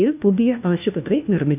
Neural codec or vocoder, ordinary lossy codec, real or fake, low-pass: codec, 16 kHz, 1 kbps, FunCodec, trained on LibriTTS, 50 frames a second; none; fake; 3.6 kHz